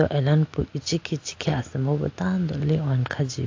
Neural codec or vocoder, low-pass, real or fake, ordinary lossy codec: none; 7.2 kHz; real; AAC, 48 kbps